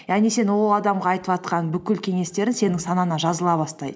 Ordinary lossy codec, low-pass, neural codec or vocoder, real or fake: none; none; none; real